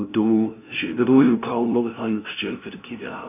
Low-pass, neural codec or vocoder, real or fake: 3.6 kHz; codec, 16 kHz, 0.5 kbps, FunCodec, trained on LibriTTS, 25 frames a second; fake